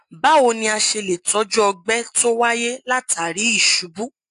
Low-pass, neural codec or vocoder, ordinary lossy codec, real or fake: 10.8 kHz; none; AAC, 64 kbps; real